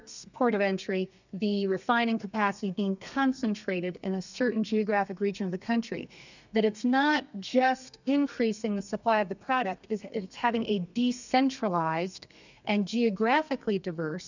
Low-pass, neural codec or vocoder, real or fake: 7.2 kHz; codec, 32 kHz, 1.9 kbps, SNAC; fake